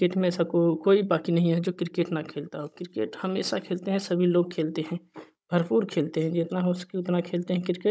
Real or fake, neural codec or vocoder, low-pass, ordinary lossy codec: fake; codec, 16 kHz, 16 kbps, FunCodec, trained on Chinese and English, 50 frames a second; none; none